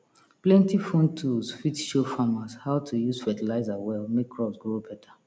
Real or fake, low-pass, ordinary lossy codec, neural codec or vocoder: real; none; none; none